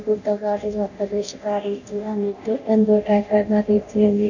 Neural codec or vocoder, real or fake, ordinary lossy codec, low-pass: codec, 24 kHz, 0.9 kbps, DualCodec; fake; none; 7.2 kHz